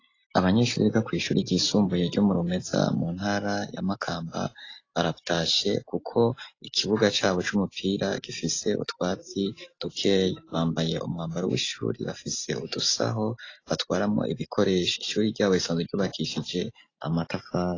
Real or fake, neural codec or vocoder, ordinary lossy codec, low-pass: real; none; AAC, 32 kbps; 7.2 kHz